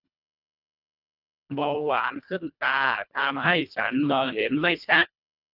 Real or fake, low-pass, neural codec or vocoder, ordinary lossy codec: fake; 5.4 kHz; codec, 24 kHz, 1.5 kbps, HILCodec; none